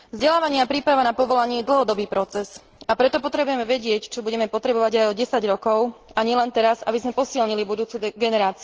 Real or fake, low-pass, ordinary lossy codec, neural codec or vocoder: real; 7.2 kHz; Opus, 16 kbps; none